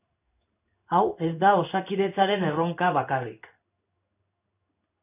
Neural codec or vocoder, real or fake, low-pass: codec, 16 kHz in and 24 kHz out, 1 kbps, XY-Tokenizer; fake; 3.6 kHz